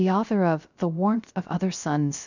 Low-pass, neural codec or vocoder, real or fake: 7.2 kHz; codec, 16 kHz, 0.3 kbps, FocalCodec; fake